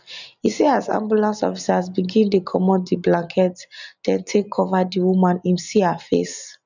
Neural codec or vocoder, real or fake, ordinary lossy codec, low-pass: none; real; none; 7.2 kHz